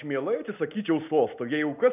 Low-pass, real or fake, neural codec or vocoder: 3.6 kHz; real; none